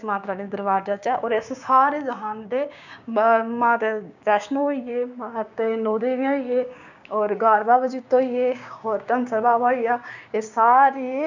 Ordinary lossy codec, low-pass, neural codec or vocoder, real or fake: none; 7.2 kHz; codec, 16 kHz, 6 kbps, DAC; fake